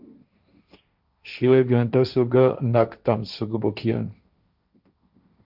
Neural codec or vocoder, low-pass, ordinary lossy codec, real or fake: codec, 16 kHz, 1.1 kbps, Voila-Tokenizer; 5.4 kHz; Opus, 64 kbps; fake